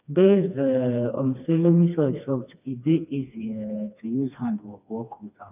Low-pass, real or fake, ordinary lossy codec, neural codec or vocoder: 3.6 kHz; fake; none; codec, 16 kHz, 2 kbps, FreqCodec, smaller model